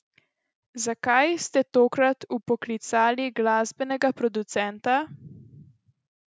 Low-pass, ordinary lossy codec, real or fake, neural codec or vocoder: none; none; real; none